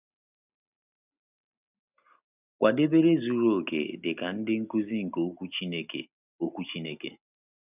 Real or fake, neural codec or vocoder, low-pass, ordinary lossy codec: real; none; 3.6 kHz; none